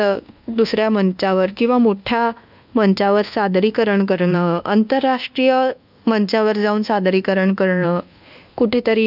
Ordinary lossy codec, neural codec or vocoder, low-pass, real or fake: none; codec, 24 kHz, 1.2 kbps, DualCodec; 5.4 kHz; fake